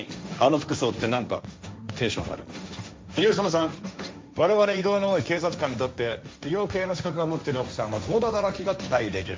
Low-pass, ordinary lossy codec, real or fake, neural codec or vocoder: none; none; fake; codec, 16 kHz, 1.1 kbps, Voila-Tokenizer